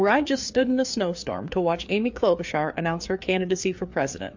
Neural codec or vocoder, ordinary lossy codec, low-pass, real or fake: codec, 16 kHz, 4 kbps, FreqCodec, larger model; MP3, 48 kbps; 7.2 kHz; fake